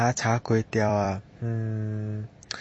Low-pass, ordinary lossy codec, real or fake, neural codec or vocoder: 9.9 kHz; MP3, 32 kbps; fake; vocoder, 44.1 kHz, 128 mel bands every 256 samples, BigVGAN v2